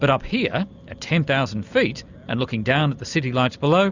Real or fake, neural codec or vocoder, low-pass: real; none; 7.2 kHz